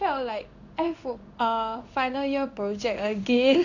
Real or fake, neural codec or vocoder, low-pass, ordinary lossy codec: fake; codec, 16 kHz in and 24 kHz out, 1 kbps, XY-Tokenizer; 7.2 kHz; none